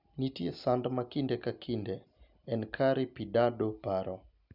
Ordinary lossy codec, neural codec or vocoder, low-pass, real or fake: none; none; 5.4 kHz; real